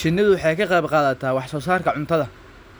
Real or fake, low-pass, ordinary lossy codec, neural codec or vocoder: real; none; none; none